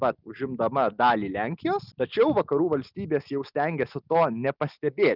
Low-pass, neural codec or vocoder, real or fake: 5.4 kHz; none; real